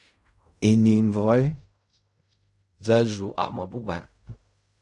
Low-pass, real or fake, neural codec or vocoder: 10.8 kHz; fake; codec, 16 kHz in and 24 kHz out, 0.4 kbps, LongCat-Audio-Codec, fine tuned four codebook decoder